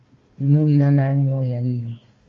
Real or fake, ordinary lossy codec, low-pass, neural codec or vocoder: fake; Opus, 32 kbps; 7.2 kHz; codec, 16 kHz, 1 kbps, FunCodec, trained on Chinese and English, 50 frames a second